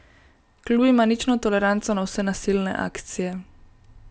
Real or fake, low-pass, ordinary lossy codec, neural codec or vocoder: real; none; none; none